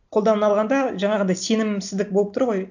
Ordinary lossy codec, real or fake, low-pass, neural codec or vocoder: none; real; 7.2 kHz; none